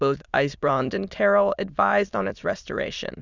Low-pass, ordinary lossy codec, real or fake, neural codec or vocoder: 7.2 kHz; Opus, 64 kbps; fake; autoencoder, 22.05 kHz, a latent of 192 numbers a frame, VITS, trained on many speakers